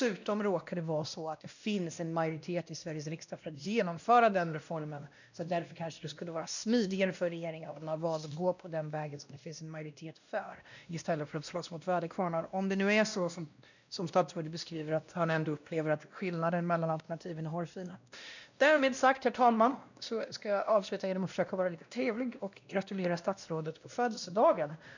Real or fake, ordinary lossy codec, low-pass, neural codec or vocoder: fake; none; 7.2 kHz; codec, 16 kHz, 1 kbps, X-Codec, WavLM features, trained on Multilingual LibriSpeech